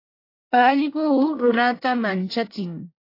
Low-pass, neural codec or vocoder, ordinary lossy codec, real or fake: 5.4 kHz; codec, 24 kHz, 1 kbps, SNAC; AAC, 32 kbps; fake